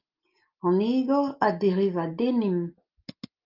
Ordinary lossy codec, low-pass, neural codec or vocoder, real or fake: Opus, 32 kbps; 5.4 kHz; none; real